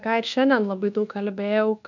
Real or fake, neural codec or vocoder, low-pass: fake; codec, 24 kHz, 1.2 kbps, DualCodec; 7.2 kHz